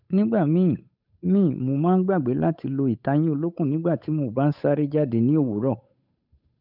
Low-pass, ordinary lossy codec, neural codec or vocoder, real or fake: 5.4 kHz; none; codec, 16 kHz, 8 kbps, FunCodec, trained on Chinese and English, 25 frames a second; fake